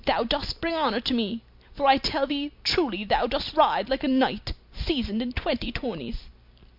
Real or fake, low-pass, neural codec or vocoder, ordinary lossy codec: real; 5.4 kHz; none; MP3, 48 kbps